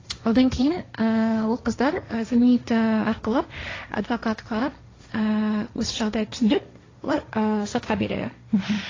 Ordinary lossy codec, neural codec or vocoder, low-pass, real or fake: AAC, 32 kbps; codec, 16 kHz, 1.1 kbps, Voila-Tokenizer; 7.2 kHz; fake